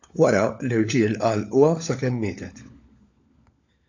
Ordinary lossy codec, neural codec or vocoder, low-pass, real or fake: AAC, 48 kbps; codec, 16 kHz, 16 kbps, FunCodec, trained on LibriTTS, 50 frames a second; 7.2 kHz; fake